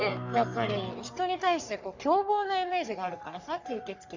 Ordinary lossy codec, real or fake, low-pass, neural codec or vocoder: none; fake; 7.2 kHz; codec, 44.1 kHz, 3.4 kbps, Pupu-Codec